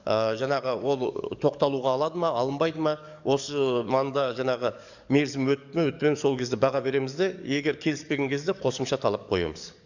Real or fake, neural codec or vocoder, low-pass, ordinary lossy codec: fake; codec, 44.1 kHz, 7.8 kbps, DAC; 7.2 kHz; none